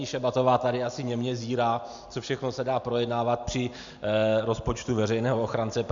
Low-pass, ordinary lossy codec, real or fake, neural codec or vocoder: 7.2 kHz; MP3, 64 kbps; real; none